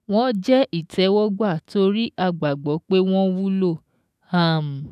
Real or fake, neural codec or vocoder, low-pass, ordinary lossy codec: fake; autoencoder, 48 kHz, 128 numbers a frame, DAC-VAE, trained on Japanese speech; 14.4 kHz; none